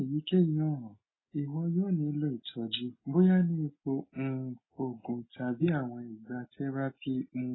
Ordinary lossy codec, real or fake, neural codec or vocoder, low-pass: AAC, 16 kbps; real; none; 7.2 kHz